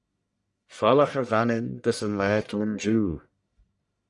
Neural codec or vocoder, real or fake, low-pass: codec, 44.1 kHz, 1.7 kbps, Pupu-Codec; fake; 10.8 kHz